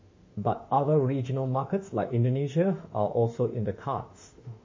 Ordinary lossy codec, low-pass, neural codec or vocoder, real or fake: MP3, 32 kbps; 7.2 kHz; autoencoder, 48 kHz, 32 numbers a frame, DAC-VAE, trained on Japanese speech; fake